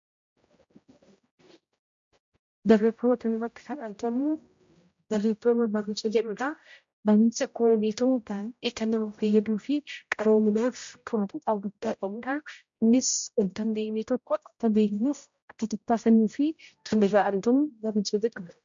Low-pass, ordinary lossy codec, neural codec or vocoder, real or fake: 7.2 kHz; MP3, 48 kbps; codec, 16 kHz, 0.5 kbps, X-Codec, HuBERT features, trained on general audio; fake